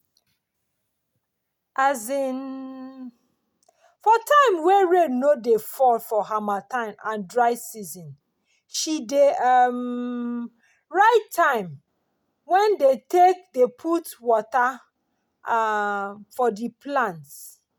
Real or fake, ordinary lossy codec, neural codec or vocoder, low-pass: real; none; none; none